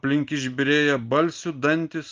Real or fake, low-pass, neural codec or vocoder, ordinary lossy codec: real; 7.2 kHz; none; Opus, 32 kbps